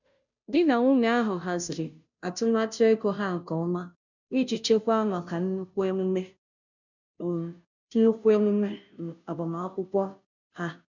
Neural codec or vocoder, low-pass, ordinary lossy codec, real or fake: codec, 16 kHz, 0.5 kbps, FunCodec, trained on Chinese and English, 25 frames a second; 7.2 kHz; none; fake